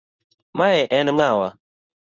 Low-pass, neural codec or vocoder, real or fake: 7.2 kHz; codec, 24 kHz, 0.9 kbps, WavTokenizer, medium speech release version 2; fake